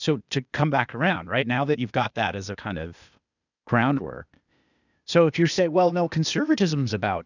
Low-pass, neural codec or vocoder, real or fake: 7.2 kHz; codec, 16 kHz, 0.8 kbps, ZipCodec; fake